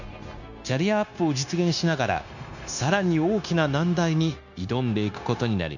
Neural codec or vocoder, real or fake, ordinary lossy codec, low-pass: codec, 16 kHz, 0.9 kbps, LongCat-Audio-Codec; fake; none; 7.2 kHz